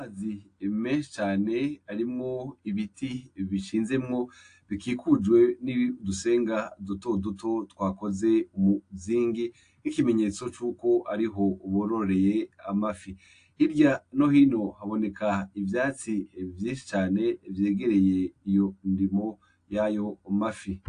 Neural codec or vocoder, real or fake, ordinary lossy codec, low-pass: none; real; AAC, 48 kbps; 9.9 kHz